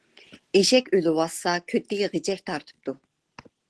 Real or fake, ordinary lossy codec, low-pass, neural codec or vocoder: real; Opus, 16 kbps; 10.8 kHz; none